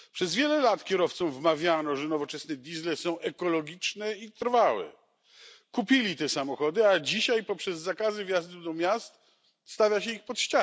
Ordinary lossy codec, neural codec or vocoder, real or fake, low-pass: none; none; real; none